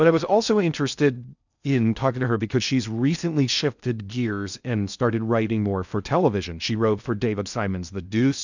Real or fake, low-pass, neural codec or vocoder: fake; 7.2 kHz; codec, 16 kHz in and 24 kHz out, 0.6 kbps, FocalCodec, streaming, 2048 codes